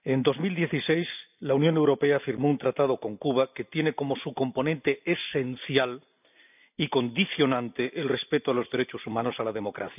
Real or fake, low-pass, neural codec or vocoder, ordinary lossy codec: real; 3.6 kHz; none; none